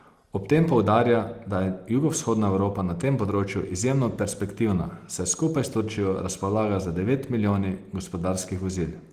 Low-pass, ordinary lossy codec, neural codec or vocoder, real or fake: 14.4 kHz; Opus, 24 kbps; none; real